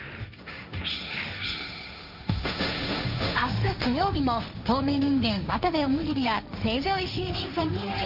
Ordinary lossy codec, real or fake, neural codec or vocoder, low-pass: none; fake; codec, 16 kHz, 1.1 kbps, Voila-Tokenizer; 5.4 kHz